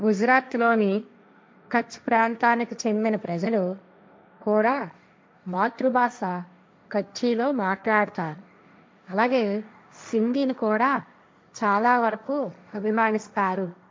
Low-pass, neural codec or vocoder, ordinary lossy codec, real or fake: none; codec, 16 kHz, 1.1 kbps, Voila-Tokenizer; none; fake